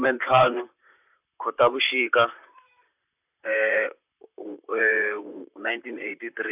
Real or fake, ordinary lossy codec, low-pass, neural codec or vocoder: fake; none; 3.6 kHz; vocoder, 44.1 kHz, 128 mel bands, Pupu-Vocoder